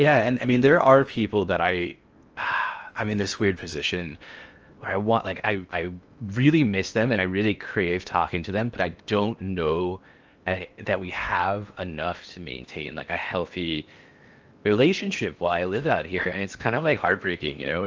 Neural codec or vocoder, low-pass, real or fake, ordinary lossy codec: codec, 16 kHz in and 24 kHz out, 0.8 kbps, FocalCodec, streaming, 65536 codes; 7.2 kHz; fake; Opus, 24 kbps